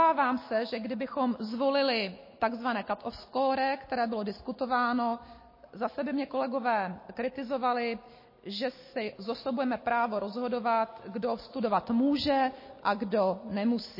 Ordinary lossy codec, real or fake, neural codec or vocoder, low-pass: MP3, 24 kbps; real; none; 5.4 kHz